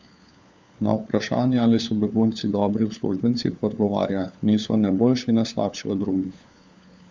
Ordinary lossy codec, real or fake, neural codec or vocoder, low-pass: none; fake; codec, 16 kHz, 4 kbps, FunCodec, trained on LibriTTS, 50 frames a second; none